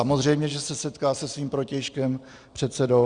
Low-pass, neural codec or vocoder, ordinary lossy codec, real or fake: 9.9 kHz; none; Opus, 64 kbps; real